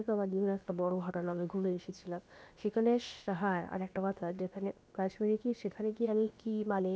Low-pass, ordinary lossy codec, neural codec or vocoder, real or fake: none; none; codec, 16 kHz, about 1 kbps, DyCAST, with the encoder's durations; fake